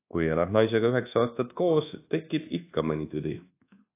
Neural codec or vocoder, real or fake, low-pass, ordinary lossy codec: codec, 24 kHz, 1.2 kbps, DualCodec; fake; 3.6 kHz; AAC, 24 kbps